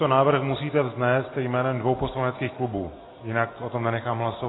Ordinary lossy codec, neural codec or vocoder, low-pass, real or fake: AAC, 16 kbps; none; 7.2 kHz; real